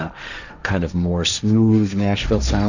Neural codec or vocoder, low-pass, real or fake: codec, 16 kHz, 1.1 kbps, Voila-Tokenizer; 7.2 kHz; fake